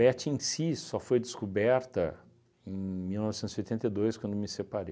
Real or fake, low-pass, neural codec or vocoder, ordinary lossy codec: real; none; none; none